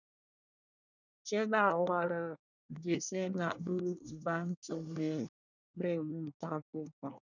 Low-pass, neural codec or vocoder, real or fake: 7.2 kHz; codec, 24 kHz, 1 kbps, SNAC; fake